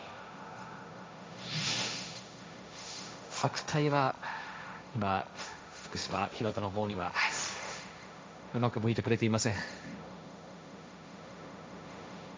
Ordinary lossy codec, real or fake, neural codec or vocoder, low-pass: none; fake; codec, 16 kHz, 1.1 kbps, Voila-Tokenizer; none